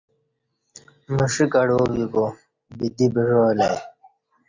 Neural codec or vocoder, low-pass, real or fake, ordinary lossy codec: none; 7.2 kHz; real; Opus, 64 kbps